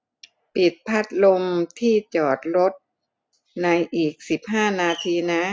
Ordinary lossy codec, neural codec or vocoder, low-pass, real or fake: none; none; none; real